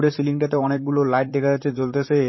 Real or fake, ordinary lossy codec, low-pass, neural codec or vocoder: fake; MP3, 24 kbps; 7.2 kHz; vocoder, 44.1 kHz, 128 mel bands, Pupu-Vocoder